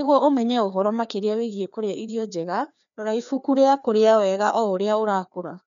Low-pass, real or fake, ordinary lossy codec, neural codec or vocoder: 7.2 kHz; fake; none; codec, 16 kHz, 2 kbps, FreqCodec, larger model